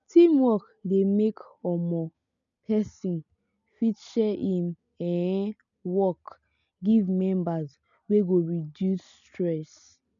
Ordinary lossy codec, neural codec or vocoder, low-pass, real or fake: none; none; 7.2 kHz; real